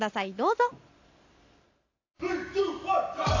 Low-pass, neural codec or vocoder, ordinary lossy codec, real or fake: 7.2 kHz; none; AAC, 48 kbps; real